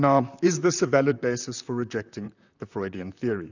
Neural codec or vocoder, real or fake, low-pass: vocoder, 44.1 kHz, 128 mel bands, Pupu-Vocoder; fake; 7.2 kHz